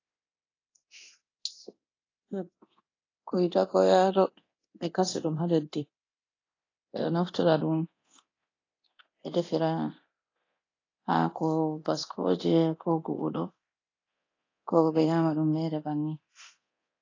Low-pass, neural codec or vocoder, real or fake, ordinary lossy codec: 7.2 kHz; codec, 24 kHz, 0.9 kbps, DualCodec; fake; AAC, 32 kbps